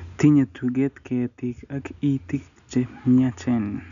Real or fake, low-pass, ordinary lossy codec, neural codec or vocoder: real; 7.2 kHz; none; none